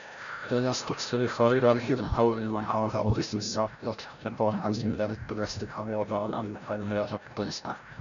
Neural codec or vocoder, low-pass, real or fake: codec, 16 kHz, 0.5 kbps, FreqCodec, larger model; 7.2 kHz; fake